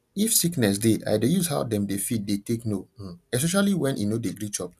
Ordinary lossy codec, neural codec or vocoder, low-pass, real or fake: none; none; 14.4 kHz; real